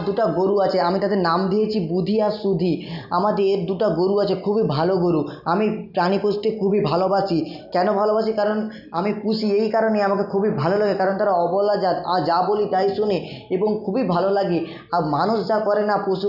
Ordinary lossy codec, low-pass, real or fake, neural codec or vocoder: none; 5.4 kHz; real; none